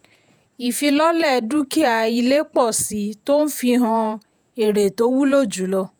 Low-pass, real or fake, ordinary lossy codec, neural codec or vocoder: none; fake; none; vocoder, 48 kHz, 128 mel bands, Vocos